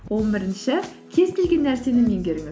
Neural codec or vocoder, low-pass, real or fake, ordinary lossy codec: none; none; real; none